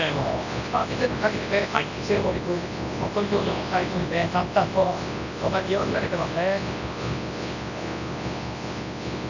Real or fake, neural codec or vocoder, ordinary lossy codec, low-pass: fake; codec, 24 kHz, 0.9 kbps, WavTokenizer, large speech release; none; 7.2 kHz